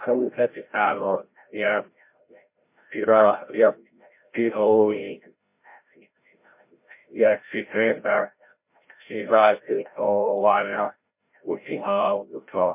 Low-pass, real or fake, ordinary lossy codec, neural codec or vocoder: 3.6 kHz; fake; MP3, 24 kbps; codec, 16 kHz, 0.5 kbps, FreqCodec, larger model